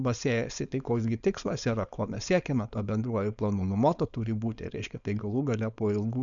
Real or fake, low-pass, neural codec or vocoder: fake; 7.2 kHz; codec, 16 kHz, 4.8 kbps, FACodec